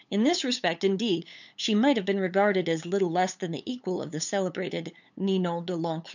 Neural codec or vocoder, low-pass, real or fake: codec, 16 kHz, 8 kbps, FunCodec, trained on LibriTTS, 25 frames a second; 7.2 kHz; fake